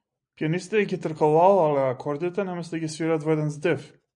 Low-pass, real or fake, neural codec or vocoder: 9.9 kHz; real; none